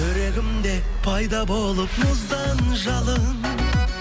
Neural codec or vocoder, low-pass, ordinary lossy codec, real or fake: none; none; none; real